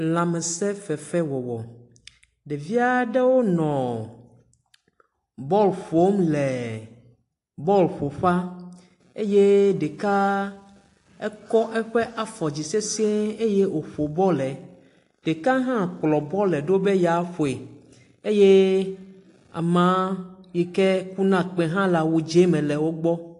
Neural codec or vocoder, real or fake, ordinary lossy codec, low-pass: none; real; AAC, 48 kbps; 10.8 kHz